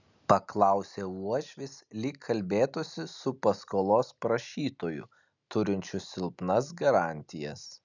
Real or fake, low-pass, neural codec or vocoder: real; 7.2 kHz; none